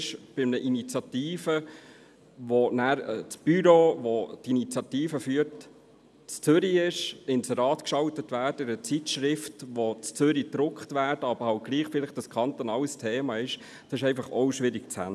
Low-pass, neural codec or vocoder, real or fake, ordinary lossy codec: none; none; real; none